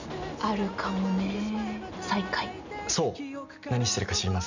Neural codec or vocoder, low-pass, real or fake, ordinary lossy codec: none; 7.2 kHz; real; none